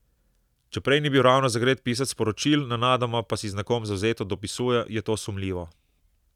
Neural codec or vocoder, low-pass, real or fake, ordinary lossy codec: none; 19.8 kHz; real; none